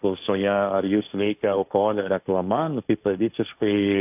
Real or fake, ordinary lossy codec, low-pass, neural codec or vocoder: fake; AAC, 32 kbps; 3.6 kHz; codec, 16 kHz, 1.1 kbps, Voila-Tokenizer